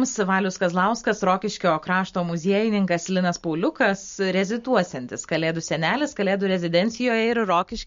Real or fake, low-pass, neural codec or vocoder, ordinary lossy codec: real; 7.2 kHz; none; MP3, 48 kbps